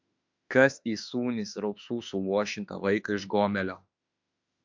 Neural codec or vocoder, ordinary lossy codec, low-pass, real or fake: autoencoder, 48 kHz, 32 numbers a frame, DAC-VAE, trained on Japanese speech; MP3, 64 kbps; 7.2 kHz; fake